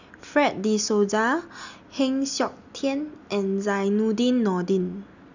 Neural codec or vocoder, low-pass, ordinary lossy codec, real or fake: none; 7.2 kHz; none; real